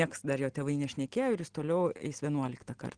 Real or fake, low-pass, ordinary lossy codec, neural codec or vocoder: real; 9.9 kHz; Opus, 16 kbps; none